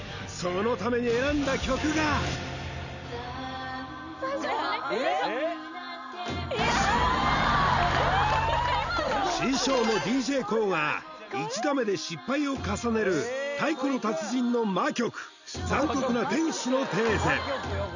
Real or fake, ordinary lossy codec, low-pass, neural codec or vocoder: real; none; 7.2 kHz; none